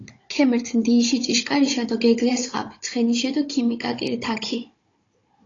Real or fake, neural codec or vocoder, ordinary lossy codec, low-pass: fake; codec, 16 kHz, 16 kbps, FunCodec, trained on Chinese and English, 50 frames a second; AAC, 32 kbps; 7.2 kHz